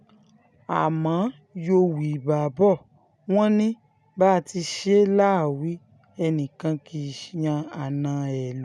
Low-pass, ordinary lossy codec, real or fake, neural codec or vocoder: none; none; real; none